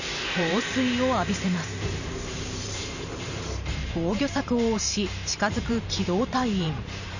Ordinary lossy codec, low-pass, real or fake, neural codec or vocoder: none; 7.2 kHz; fake; vocoder, 44.1 kHz, 128 mel bands every 512 samples, BigVGAN v2